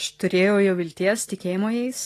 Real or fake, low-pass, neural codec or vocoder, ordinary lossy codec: real; 14.4 kHz; none; AAC, 48 kbps